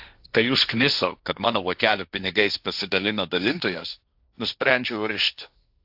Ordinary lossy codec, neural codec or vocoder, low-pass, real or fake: AAC, 48 kbps; codec, 16 kHz, 1.1 kbps, Voila-Tokenizer; 5.4 kHz; fake